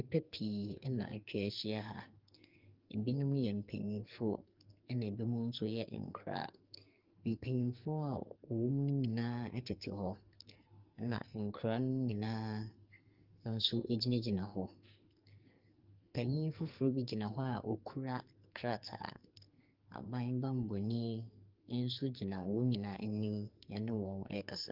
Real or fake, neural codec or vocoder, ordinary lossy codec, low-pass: fake; codec, 44.1 kHz, 2.6 kbps, SNAC; Opus, 24 kbps; 5.4 kHz